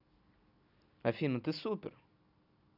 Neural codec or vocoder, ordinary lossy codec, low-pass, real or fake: none; none; 5.4 kHz; real